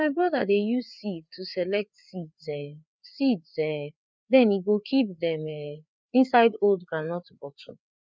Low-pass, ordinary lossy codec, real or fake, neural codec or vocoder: none; none; fake; codec, 16 kHz, 4 kbps, FreqCodec, larger model